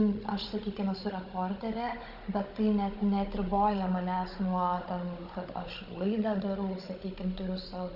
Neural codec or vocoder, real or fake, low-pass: codec, 16 kHz, 16 kbps, FunCodec, trained on Chinese and English, 50 frames a second; fake; 5.4 kHz